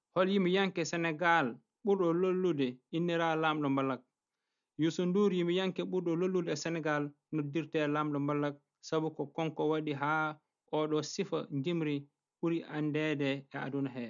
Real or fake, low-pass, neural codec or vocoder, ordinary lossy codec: real; 7.2 kHz; none; none